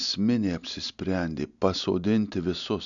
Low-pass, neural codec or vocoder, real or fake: 7.2 kHz; none; real